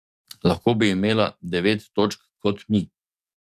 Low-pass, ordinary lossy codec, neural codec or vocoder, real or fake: 14.4 kHz; none; codec, 44.1 kHz, 7.8 kbps, DAC; fake